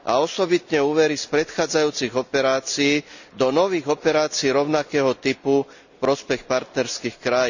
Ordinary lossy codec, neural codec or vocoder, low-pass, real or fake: none; none; 7.2 kHz; real